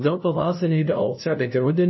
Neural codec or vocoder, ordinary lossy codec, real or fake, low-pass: codec, 16 kHz, 0.5 kbps, FunCodec, trained on LibriTTS, 25 frames a second; MP3, 24 kbps; fake; 7.2 kHz